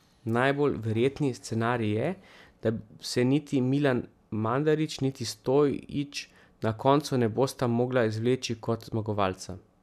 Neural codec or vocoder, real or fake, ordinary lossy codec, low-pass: none; real; none; 14.4 kHz